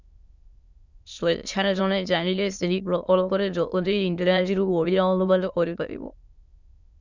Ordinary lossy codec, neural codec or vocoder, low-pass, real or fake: Opus, 64 kbps; autoencoder, 22.05 kHz, a latent of 192 numbers a frame, VITS, trained on many speakers; 7.2 kHz; fake